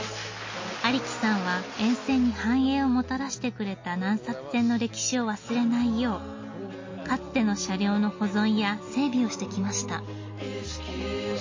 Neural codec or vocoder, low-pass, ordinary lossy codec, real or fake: none; 7.2 kHz; MP3, 32 kbps; real